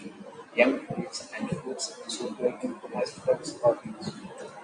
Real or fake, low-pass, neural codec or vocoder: real; 9.9 kHz; none